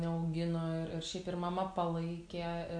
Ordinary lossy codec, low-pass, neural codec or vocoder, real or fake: AAC, 64 kbps; 9.9 kHz; none; real